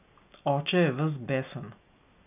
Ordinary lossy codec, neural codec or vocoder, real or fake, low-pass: none; none; real; 3.6 kHz